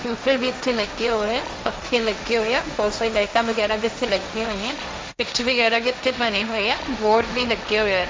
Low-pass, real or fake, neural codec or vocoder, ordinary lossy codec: none; fake; codec, 16 kHz, 1.1 kbps, Voila-Tokenizer; none